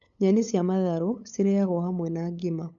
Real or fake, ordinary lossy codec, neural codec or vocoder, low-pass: fake; none; codec, 16 kHz, 8 kbps, FunCodec, trained on LibriTTS, 25 frames a second; 7.2 kHz